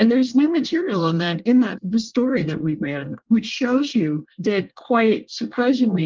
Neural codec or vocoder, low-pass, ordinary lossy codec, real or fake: codec, 24 kHz, 1 kbps, SNAC; 7.2 kHz; Opus, 32 kbps; fake